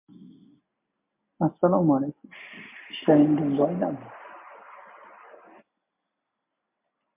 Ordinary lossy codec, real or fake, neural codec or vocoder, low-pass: MP3, 32 kbps; real; none; 3.6 kHz